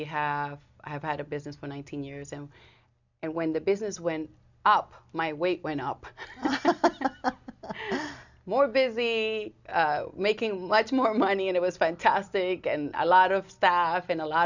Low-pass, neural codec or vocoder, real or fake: 7.2 kHz; none; real